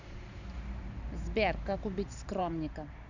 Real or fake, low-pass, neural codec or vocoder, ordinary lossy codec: fake; 7.2 kHz; vocoder, 44.1 kHz, 128 mel bands every 512 samples, BigVGAN v2; none